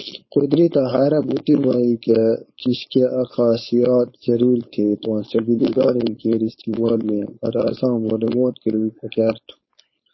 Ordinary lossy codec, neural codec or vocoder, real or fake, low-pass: MP3, 24 kbps; codec, 16 kHz, 4.8 kbps, FACodec; fake; 7.2 kHz